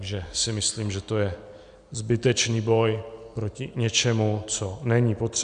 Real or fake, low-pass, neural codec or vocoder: real; 9.9 kHz; none